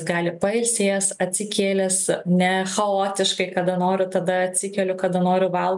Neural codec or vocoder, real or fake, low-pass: none; real; 10.8 kHz